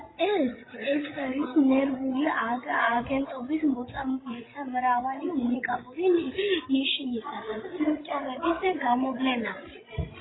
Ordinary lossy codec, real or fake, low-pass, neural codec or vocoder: AAC, 16 kbps; fake; 7.2 kHz; codec, 16 kHz, 16 kbps, FreqCodec, larger model